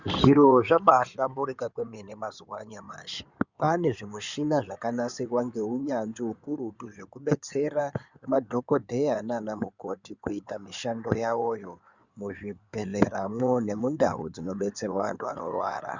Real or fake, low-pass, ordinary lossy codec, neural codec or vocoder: fake; 7.2 kHz; Opus, 64 kbps; codec, 16 kHz in and 24 kHz out, 2.2 kbps, FireRedTTS-2 codec